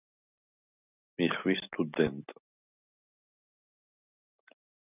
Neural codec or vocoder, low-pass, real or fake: none; 3.6 kHz; real